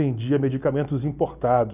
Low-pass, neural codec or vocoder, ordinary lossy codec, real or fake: 3.6 kHz; none; none; real